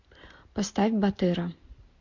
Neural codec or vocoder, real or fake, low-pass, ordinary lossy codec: none; real; 7.2 kHz; MP3, 48 kbps